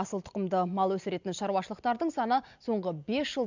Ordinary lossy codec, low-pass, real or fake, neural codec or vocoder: none; 7.2 kHz; real; none